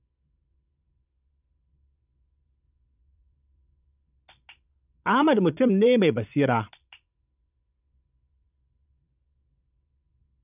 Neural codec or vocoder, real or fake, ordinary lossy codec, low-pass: vocoder, 22.05 kHz, 80 mel bands, Vocos; fake; none; 3.6 kHz